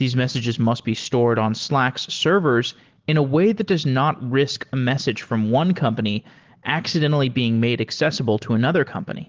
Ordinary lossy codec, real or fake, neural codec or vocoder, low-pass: Opus, 16 kbps; real; none; 7.2 kHz